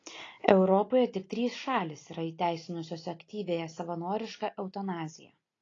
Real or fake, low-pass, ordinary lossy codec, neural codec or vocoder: real; 7.2 kHz; AAC, 32 kbps; none